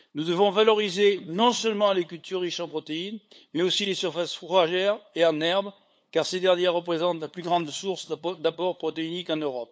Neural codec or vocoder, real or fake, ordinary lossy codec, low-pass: codec, 16 kHz, 8 kbps, FunCodec, trained on LibriTTS, 25 frames a second; fake; none; none